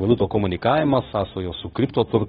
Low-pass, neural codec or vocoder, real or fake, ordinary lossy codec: 7.2 kHz; codec, 16 kHz, 0.9 kbps, LongCat-Audio-Codec; fake; AAC, 16 kbps